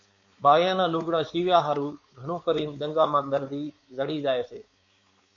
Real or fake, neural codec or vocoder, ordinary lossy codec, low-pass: fake; codec, 16 kHz, 4 kbps, X-Codec, WavLM features, trained on Multilingual LibriSpeech; MP3, 48 kbps; 7.2 kHz